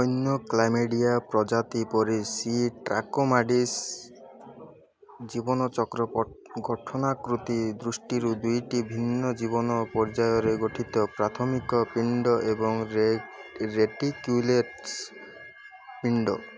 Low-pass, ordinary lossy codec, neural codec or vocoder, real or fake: none; none; none; real